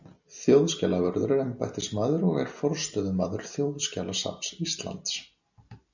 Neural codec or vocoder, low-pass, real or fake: none; 7.2 kHz; real